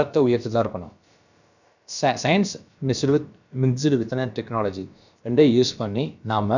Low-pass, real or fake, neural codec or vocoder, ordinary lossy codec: 7.2 kHz; fake; codec, 16 kHz, about 1 kbps, DyCAST, with the encoder's durations; none